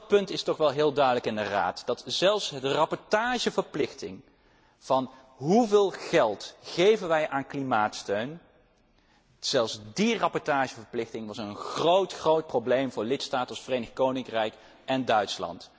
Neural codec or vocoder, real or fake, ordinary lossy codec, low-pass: none; real; none; none